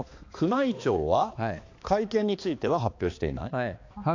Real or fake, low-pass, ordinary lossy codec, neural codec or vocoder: fake; 7.2 kHz; AAC, 48 kbps; codec, 16 kHz, 2 kbps, X-Codec, HuBERT features, trained on balanced general audio